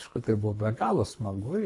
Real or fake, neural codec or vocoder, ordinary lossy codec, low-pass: fake; codec, 24 kHz, 3 kbps, HILCodec; AAC, 64 kbps; 10.8 kHz